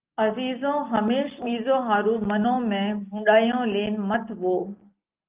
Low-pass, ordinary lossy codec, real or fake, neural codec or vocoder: 3.6 kHz; Opus, 32 kbps; real; none